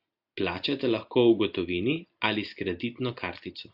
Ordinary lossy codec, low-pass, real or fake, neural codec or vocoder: AAC, 48 kbps; 5.4 kHz; real; none